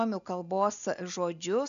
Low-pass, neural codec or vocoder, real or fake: 7.2 kHz; none; real